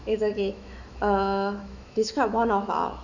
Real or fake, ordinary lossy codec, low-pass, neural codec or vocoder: fake; none; 7.2 kHz; autoencoder, 48 kHz, 128 numbers a frame, DAC-VAE, trained on Japanese speech